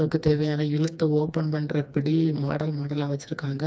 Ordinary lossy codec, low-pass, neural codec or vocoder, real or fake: none; none; codec, 16 kHz, 2 kbps, FreqCodec, smaller model; fake